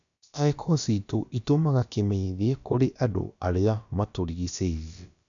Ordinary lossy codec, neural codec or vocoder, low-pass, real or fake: none; codec, 16 kHz, about 1 kbps, DyCAST, with the encoder's durations; 7.2 kHz; fake